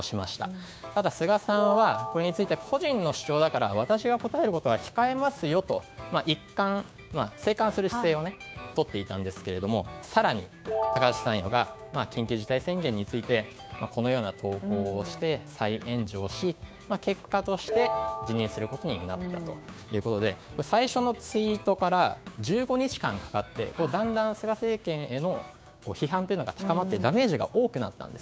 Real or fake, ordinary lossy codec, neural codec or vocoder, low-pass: fake; none; codec, 16 kHz, 6 kbps, DAC; none